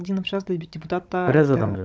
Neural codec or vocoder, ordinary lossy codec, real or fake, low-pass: codec, 16 kHz, 16 kbps, FunCodec, trained on Chinese and English, 50 frames a second; none; fake; none